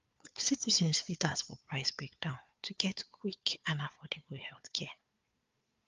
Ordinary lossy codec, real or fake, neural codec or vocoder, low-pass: Opus, 32 kbps; fake; codec, 16 kHz, 4 kbps, FunCodec, trained on Chinese and English, 50 frames a second; 7.2 kHz